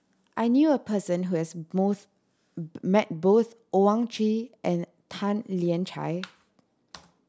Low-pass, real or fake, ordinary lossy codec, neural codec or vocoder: none; real; none; none